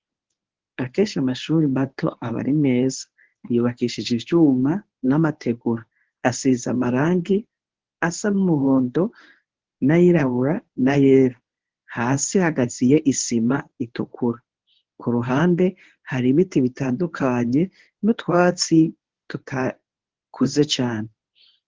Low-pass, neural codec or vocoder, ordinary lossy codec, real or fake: 7.2 kHz; codec, 24 kHz, 0.9 kbps, WavTokenizer, medium speech release version 1; Opus, 16 kbps; fake